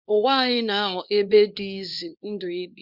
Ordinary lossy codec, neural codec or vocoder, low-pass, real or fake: none; codec, 24 kHz, 0.9 kbps, WavTokenizer, small release; 5.4 kHz; fake